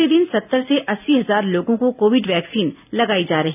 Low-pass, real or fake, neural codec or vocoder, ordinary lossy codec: 3.6 kHz; real; none; none